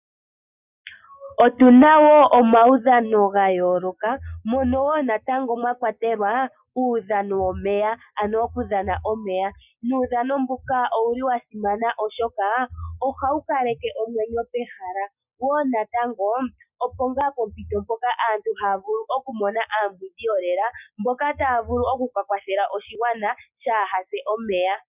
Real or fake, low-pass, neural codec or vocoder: real; 3.6 kHz; none